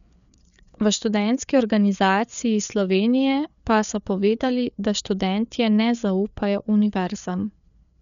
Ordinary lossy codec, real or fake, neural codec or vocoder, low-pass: none; fake; codec, 16 kHz, 4 kbps, FreqCodec, larger model; 7.2 kHz